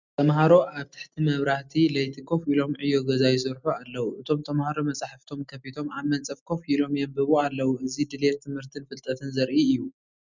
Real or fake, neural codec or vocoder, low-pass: real; none; 7.2 kHz